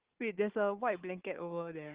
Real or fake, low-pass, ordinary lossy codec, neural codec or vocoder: fake; 3.6 kHz; Opus, 16 kbps; codec, 16 kHz, 4 kbps, FunCodec, trained on Chinese and English, 50 frames a second